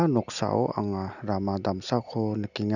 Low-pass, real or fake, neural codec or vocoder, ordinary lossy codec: 7.2 kHz; real; none; none